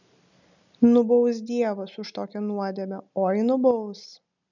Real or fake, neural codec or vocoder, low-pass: real; none; 7.2 kHz